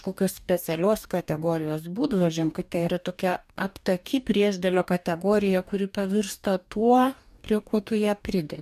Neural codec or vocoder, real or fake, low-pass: codec, 44.1 kHz, 2.6 kbps, DAC; fake; 14.4 kHz